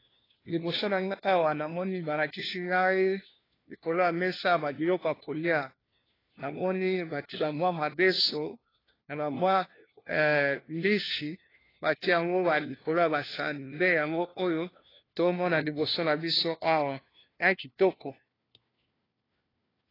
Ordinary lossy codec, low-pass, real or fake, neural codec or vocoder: AAC, 24 kbps; 5.4 kHz; fake; codec, 16 kHz, 1 kbps, FunCodec, trained on LibriTTS, 50 frames a second